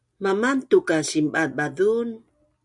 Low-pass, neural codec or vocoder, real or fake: 10.8 kHz; none; real